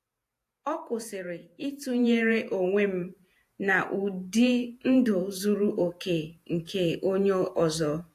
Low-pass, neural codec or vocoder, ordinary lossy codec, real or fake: 14.4 kHz; vocoder, 48 kHz, 128 mel bands, Vocos; AAC, 64 kbps; fake